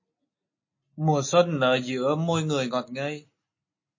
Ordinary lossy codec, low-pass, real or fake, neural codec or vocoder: MP3, 32 kbps; 7.2 kHz; real; none